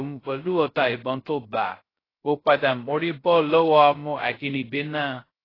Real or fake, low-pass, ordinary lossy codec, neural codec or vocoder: fake; 5.4 kHz; AAC, 24 kbps; codec, 16 kHz, 0.2 kbps, FocalCodec